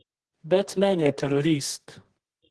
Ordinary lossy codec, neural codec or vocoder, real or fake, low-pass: Opus, 16 kbps; codec, 24 kHz, 0.9 kbps, WavTokenizer, medium music audio release; fake; 10.8 kHz